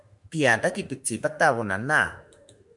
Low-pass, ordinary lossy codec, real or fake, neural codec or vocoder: 10.8 kHz; MP3, 96 kbps; fake; autoencoder, 48 kHz, 32 numbers a frame, DAC-VAE, trained on Japanese speech